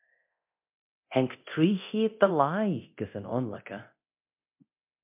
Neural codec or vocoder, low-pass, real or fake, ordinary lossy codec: codec, 24 kHz, 0.9 kbps, DualCodec; 3.6 kHz; fake; MP3, 32 kbps